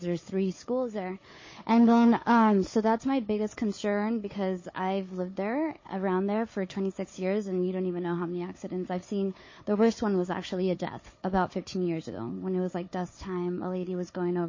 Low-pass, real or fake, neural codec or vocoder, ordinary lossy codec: 7.2 kHz; fake; codec, 16 kHz, 4 kbps, FunCodec, trained on Chinese and English, 50 frames a second; MP3, 32 kbps